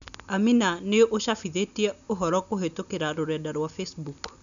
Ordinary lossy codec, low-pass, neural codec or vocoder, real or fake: none; 7.2 kHz; none; real